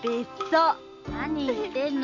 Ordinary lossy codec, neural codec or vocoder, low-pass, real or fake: MP3, 64 kbps; none; 7.2 kHz; real